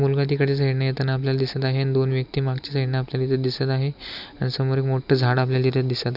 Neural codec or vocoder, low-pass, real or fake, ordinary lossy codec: none; 5.4 kHz; real; none